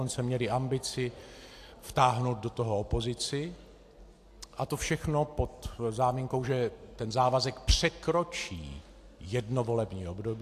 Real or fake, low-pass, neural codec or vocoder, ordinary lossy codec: real; 14.4 kHz; none; AAC, 64 kbps